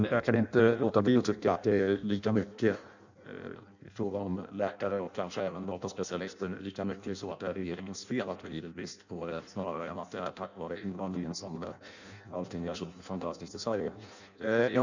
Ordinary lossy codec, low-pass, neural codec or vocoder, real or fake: none; 7.2 kHz; codec, 16 kHz in and 24 kHz out, 0.6 kbps, FireRedTTS-2 codec; fake